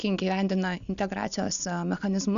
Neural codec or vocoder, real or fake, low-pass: none; real; 7.2 kHz